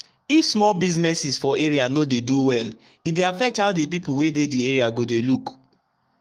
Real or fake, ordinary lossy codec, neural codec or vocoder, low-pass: fake; Opus, 32 kbps; codec, 32 kHz, 1.9 kbps, SNAC; 14.4 kHz